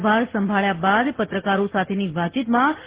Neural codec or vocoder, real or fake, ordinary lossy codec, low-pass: none; real; Opus, 16 kbps; 3.6 kHz